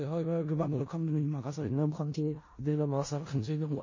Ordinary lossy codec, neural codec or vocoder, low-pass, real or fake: MP3, 32 kbps; codec, 16 kHz in and 24 kHz out, 0.4 kbps, LongCat-Audio-Codec, four codebook decoder; 7.2 kHz; fake